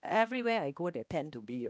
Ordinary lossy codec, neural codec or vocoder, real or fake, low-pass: none; codec, 16 kHz, 1 kbps, X-Codec, HuBERT features, trained on balanced general audio; fake; none